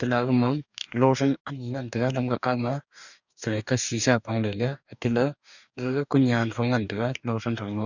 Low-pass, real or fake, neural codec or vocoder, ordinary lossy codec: 7.2 kHz; fake; codec, 44.1 kHz, 2.6 kbps, DAC; none